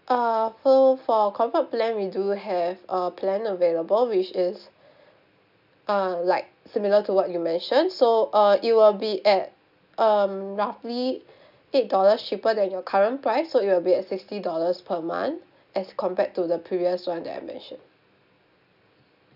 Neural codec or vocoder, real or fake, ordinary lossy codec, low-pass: none; real; none; 5.4 kHz